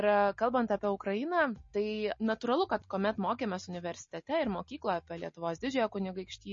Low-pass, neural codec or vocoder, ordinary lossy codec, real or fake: 7.2 kHz; none; MP3, 32 kbps; real